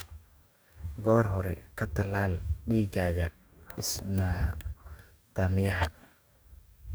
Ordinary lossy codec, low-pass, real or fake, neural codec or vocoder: none; none; fake; codec, 44.1 kHz, 2.6 kbps, DAC